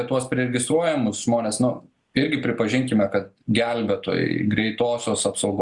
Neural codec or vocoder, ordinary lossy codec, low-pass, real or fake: none; Opus, 64 kbps; 10.8 kHz; real